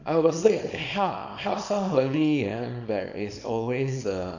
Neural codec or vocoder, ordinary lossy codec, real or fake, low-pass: codec, 24 kHz, 0.9 kbps, WavTokenizer, small release; none; fake; 7.2 kHz